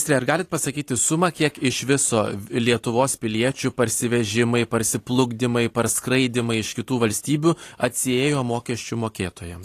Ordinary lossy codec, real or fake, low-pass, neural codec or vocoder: AAC, 48 kbps; fake; 14.4 kHz; vocoder, 44.1 kHz, 128 mel bands every 512 samples, BigVGAN v2